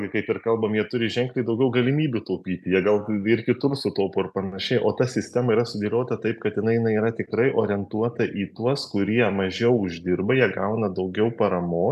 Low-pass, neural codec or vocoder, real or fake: 14.4 kHz; none; real